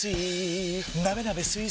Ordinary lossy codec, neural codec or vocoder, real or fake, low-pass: none; none; real; none